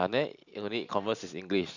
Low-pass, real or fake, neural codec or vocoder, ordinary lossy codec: 7.2 kHz; fake; vocoder, 44.1 kHz, 128 mel bands every 512 samples, BigVGAN v2; none